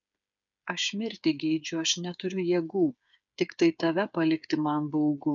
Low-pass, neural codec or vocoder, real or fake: 7.2 kHz; codec, 16 kHz, 8 kbps, FreqCodec, smaller model; fake